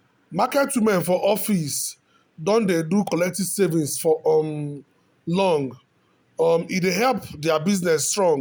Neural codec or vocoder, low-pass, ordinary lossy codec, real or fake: none; none; none; real